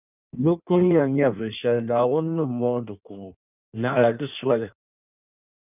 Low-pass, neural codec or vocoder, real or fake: 3.6 kHz; codec, 16 kHz in and 24 kHz out, 1.1 kbps, FireRedTTS-2 codec; fake